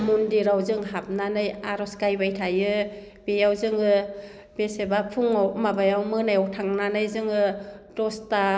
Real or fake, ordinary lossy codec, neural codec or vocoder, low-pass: real; none; none; none